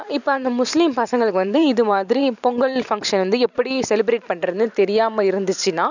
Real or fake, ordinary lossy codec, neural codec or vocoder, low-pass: fake; none; vocoder, 44.1 kHz, 128 mel bands every 512 samples, BigVGAN v2; 7.2 kHz